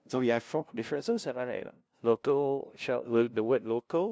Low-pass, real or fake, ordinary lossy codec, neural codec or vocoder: none; fake; none; codec, 16 kHz, 0.5 kbps, FunCodec, trained on LibriTTS, 25 frames a second